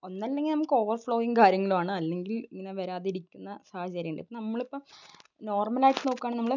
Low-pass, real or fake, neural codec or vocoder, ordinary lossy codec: 7.2 kHz; real; none; none